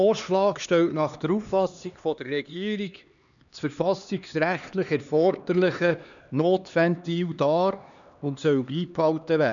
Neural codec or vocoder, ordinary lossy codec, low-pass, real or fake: codec, 16 kHz, 2 kbps, X-Codec, HuBERT features, trained on LibriSpeech; none; 7.2 kHz; fake